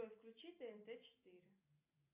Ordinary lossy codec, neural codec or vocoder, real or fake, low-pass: AAC, 32 kbps; none; real; 3.6 kHz